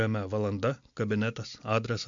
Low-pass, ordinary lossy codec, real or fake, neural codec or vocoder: 7.2 kHz; MP3, 64 kbps; real; none